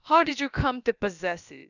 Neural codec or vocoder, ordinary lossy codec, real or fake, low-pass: codec, 16 kHz, about 1 kbps, DyCAST, with the encoder's durations; none; fake; 7.2 kHz